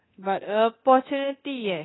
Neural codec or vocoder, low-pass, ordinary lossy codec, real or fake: codec, 24 kHz, 0.9 kbps, DualCodec; 7.2 kHz; AAC, 16 kbps; fake